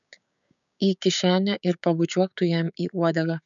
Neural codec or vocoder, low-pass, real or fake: codec, 16 kHz, 6 kbps, DAC; 7.2 kHz; fake